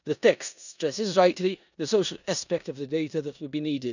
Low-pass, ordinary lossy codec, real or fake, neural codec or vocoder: 7.2 kHz; none; fake; codec, 16 kHz in and 24 kHz out, 0.9 kbps, LongCat-Audio-Codec, four codebook decoder